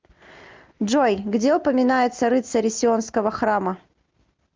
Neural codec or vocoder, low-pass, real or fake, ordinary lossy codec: none; 7.2 kHz; real; Opus, 24 kbps